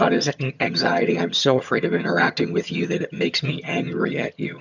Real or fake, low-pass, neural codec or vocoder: fake; 7.2 kHz; vocoder, 22.05 kHz, 80 mel bands, HiFi-GAN